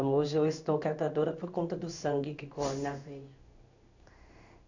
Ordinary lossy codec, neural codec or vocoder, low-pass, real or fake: none; codec, 16 kHz in and 24 kHz out, 1 kbps, XY-Tokenizer; 7.2 kHz; fake